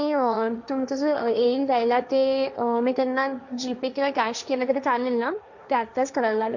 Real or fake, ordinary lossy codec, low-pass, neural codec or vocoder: fake; none; 7.2 kHz; codec, 16 kHz, 1.1 kbps, Voila-Tokenizer